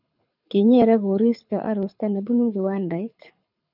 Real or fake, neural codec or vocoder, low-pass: fake; codec, 24 kHz, 6 kbps, HILCodec; 5.4 kHz